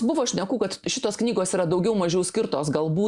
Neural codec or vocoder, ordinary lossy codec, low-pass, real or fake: none; Opus, 64 kbps; 10.8 kHz; real